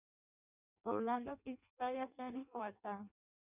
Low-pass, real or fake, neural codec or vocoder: 3.6 kHz; fake; codec, 16 kHz in and 24 kHz out, 0.6 kbps, FireRedTTS-2 codec